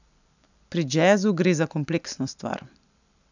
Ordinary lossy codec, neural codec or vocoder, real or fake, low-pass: none; none; real; 7.2 kHz